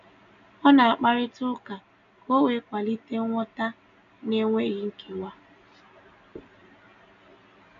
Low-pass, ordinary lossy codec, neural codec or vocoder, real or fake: 7.2 kHz; none; none; real